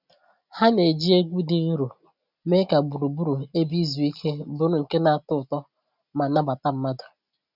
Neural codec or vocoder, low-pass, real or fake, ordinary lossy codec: none; 5.4 kHz; real; none